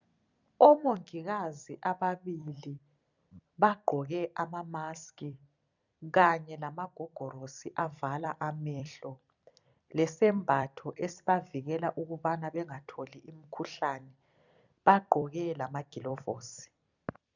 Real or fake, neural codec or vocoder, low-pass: fake; vocoder, 44.1 kHz, 128 mel bands every 256 samples, BigVGAN v2; 7.2 kHz